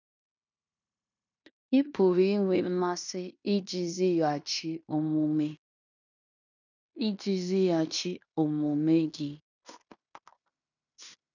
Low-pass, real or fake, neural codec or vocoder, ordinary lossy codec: 7.2 kHz; fake; codec, 16 kHz in and 24 kHz out, 0.9 kbps, LongCat-Audio-Codec, fine tuned four codebook decoder; none